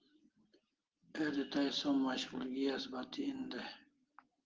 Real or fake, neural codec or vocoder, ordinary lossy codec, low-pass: real; none; Opus, 16 kbps; 7.2 kHz